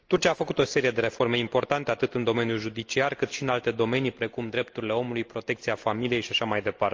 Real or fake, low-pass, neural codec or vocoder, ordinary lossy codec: real; 7.2 kHz; none; Opus, 24 kbps